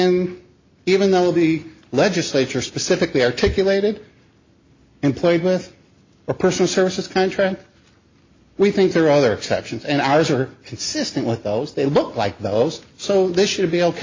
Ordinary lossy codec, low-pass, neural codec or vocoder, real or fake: MP3, 32 kbps; 7.2 kHz; none; real